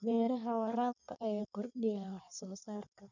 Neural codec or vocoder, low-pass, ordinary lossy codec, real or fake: codec, 16 kHz in and 24 kHz out, 1.1 kbps, FireRedTTS-2 codec; 7.2 kHz; none; fake